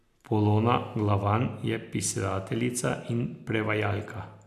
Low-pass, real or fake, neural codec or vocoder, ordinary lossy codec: 14.4 kHz; real; none; MP3, 96 kbps